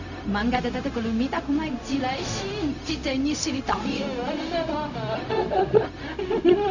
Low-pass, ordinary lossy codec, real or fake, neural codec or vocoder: 7.2 kHz; none; fake; codec, 16 kHz, 0.4 kbps, LongCat-Audio-Codec